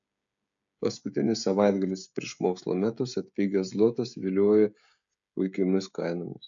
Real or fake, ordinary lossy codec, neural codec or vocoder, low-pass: fake; AAC, 64 kbps; codec, 16 kHz, 16 kbps, FreqCodec, smaller model; 7.2 kHz